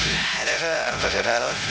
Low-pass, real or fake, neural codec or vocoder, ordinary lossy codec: none; fake; codec, 16 kHz, 0.5 kbps, X-Codec, HuBERT features, trained on LibriSpeech; none